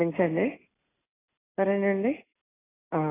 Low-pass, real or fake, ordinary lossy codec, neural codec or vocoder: 3.6 kHz; real; AAC, 16 kbps; none